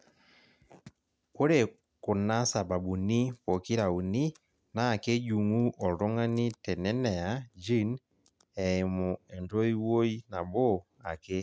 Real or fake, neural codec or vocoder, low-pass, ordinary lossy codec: real; none; none; none